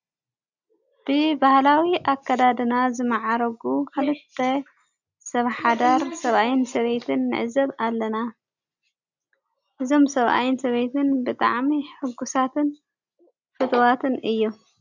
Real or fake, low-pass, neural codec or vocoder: real; 7.2 kHz; none